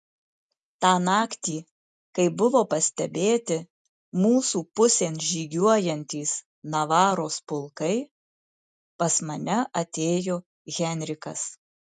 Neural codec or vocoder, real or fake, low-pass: none; real; 10.8 kHz